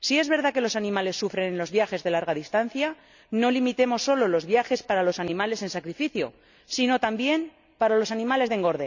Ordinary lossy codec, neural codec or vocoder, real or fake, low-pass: none; none; real; 7.2 kHz